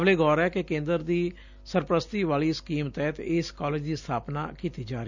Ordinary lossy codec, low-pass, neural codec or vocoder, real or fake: none; 7.2 kHz; none; real